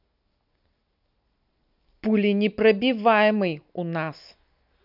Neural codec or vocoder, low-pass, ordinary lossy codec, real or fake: none; 5.4 kHz; none; real